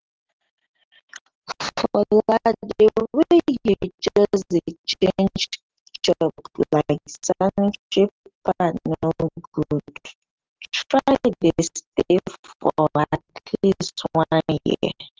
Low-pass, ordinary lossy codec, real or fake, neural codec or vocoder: 7.2 kHz; Opus, 16 kbps; real; none